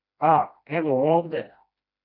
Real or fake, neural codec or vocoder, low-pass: fake; codec, 16 kHz, 1 kbps, FreqCodec, smaller model; 5.4 kHz